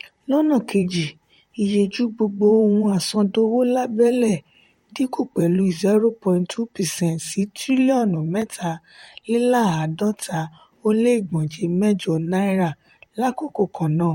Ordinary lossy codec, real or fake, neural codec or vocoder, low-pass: MP3, 64 kbps; fake; vocoder, 44.1 kHz, 128 mel bands, Pupu-Vocoder; 19.8 kHz